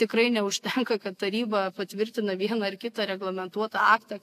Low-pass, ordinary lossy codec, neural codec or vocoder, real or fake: 14.4 kHz; AAC, 64 kbps; autoencoder, 48 kHz, 128 numbers a frame, DAC-VAE, trained on Japanese speech; fake